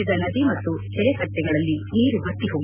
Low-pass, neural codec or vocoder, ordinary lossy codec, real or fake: 3.6 kHz; none; none; real